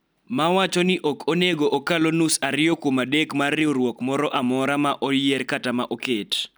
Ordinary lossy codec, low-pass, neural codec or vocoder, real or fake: none; none; none; real